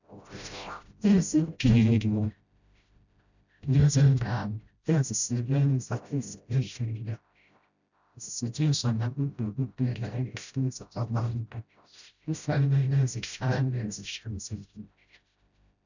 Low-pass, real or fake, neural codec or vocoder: 7.2 kHz; fake; codec, 16 kHz, 0.5 kbps, FreqCodec, smaller model